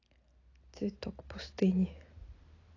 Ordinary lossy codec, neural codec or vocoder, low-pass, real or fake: AAC, 32 kbps; none; 7.2 kHz; real